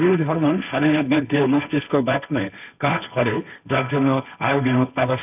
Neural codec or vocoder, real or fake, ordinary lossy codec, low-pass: codec, 16 kHz, 1.1 kbps, Voila-Tokenizer; fake; none; 3.6 kHz